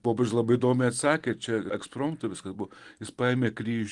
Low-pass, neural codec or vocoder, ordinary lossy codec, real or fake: 10.8 kHz; none; Opus, 24 kbps; real